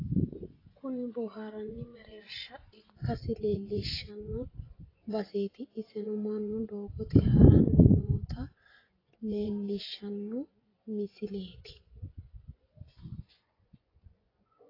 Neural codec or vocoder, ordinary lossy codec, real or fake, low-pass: vocoder, 24 kHz, 100 mel bands, Vocos; AAC, 24 kbps; fake; 5.4 kHz